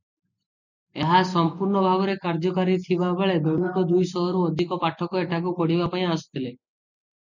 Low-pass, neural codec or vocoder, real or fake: 7.2 kHz; none; real